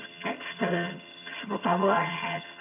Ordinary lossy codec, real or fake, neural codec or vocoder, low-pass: MP3, 32 kbps; fake; vocoder, 22.05 kHz, 80 mel bands, HiFi-GAN; 3.6 kHz